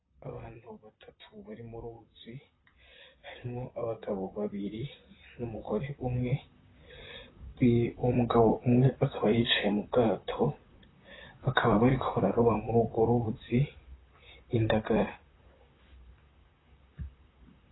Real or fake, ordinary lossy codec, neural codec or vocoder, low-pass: fake; AAC, 16 kbps; vocoder, 44.1 kHz, 128 mel bands, Pupu-Vocoder; 7.2 kHz